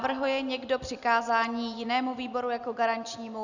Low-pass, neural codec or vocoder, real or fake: 7.2 kHz; none; real